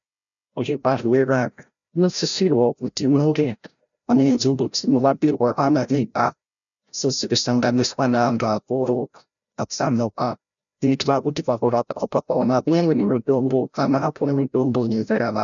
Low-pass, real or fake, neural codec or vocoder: 7.2 kHz; fake; codec, 16 kHz, 0.5 kbps, FreqCodec, larger model